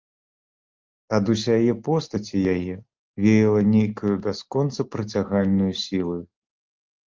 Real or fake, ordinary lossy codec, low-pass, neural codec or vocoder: real; Opus, 32 kbps; 7.2 kHz; none